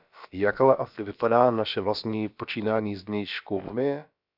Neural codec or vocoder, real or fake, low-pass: codec, 16 kHz, about 1 kbps, DyCAST, with the encoder's durations; fake; 5.4 kHz